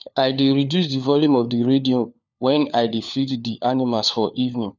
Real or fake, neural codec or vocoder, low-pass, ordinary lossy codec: fake; codec, 16 kHz, 4 kbps, FunCodec, trained on LibriTTS, 50 frames a second; 7.2 kHz; none